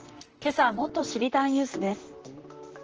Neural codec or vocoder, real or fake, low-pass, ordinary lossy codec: vocoder, 44.1 kHz, 128 mel bands, Pupu-Vocoder; fake; 7.2 kHz; Opus, 16 kbps